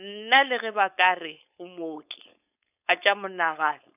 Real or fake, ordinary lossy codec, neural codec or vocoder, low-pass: fake; none; codec, 16 kHz, 4.8 kbps, FACodec; 3.6 kHz